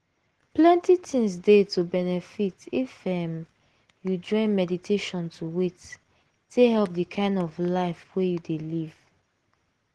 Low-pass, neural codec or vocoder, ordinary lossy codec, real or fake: 10.8 kHz; none; Opus, 16 kbps; real